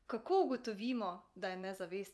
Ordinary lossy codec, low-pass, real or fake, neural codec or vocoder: none; none; fake; vocoder, 24 kHz, 100 mel bands, Vocos